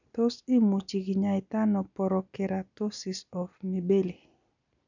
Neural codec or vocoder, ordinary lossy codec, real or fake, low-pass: none; none; real; 7.2 kHz